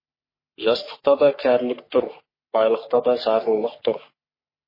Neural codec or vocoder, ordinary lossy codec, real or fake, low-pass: codec, 44.1 kHz, 3.4 kbps, Pupu-Codec; MP3, 24 kbps; fake; 5.4 kHz